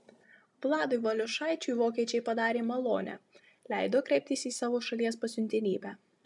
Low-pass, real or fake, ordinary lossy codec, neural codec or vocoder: 10.8 kHz; real; MP3, 96 kbps; none